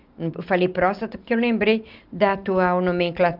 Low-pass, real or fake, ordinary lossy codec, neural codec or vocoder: 5.4 kHz; real; Opus, 24 kbps; none